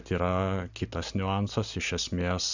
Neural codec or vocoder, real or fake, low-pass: none; real; 7.2 kHz